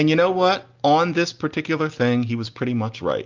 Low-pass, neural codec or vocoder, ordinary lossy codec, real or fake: 7.2 kHz; none; Opus, 24 kbps; real